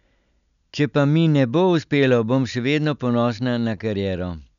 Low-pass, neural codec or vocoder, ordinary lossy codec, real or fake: 7.2 kHz; none; none; real